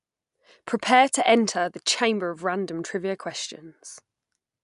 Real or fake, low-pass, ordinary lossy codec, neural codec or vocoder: real; 10.8 kHz; none; none